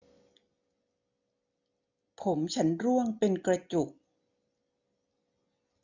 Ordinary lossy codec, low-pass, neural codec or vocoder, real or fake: none; 7.2 kHz; none; real